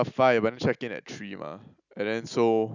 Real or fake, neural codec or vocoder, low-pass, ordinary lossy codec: real; none; 7.2 kHz; none